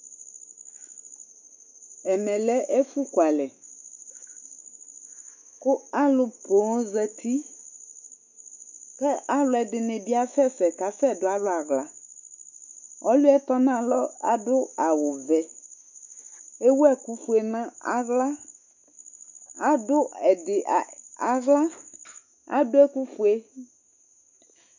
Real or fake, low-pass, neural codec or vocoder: fake; 7.2 kHz; autoencoder, 48 kHz, 128 numbers a frame, DAC-VAE, trained on Japanese speech